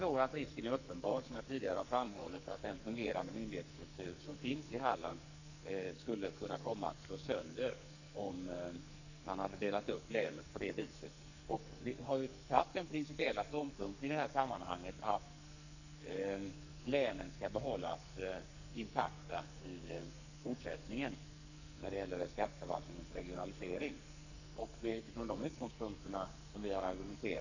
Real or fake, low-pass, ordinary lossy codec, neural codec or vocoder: fake; 7.2 kHz; none; codec, 44.1 kHz, 2.6 kbps, SNAC